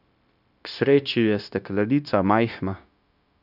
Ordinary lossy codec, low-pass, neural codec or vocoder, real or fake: none; 5.4 kHz; codec, 16 kHz, 0.9 kbps, LongCat-Audio-Codec; fake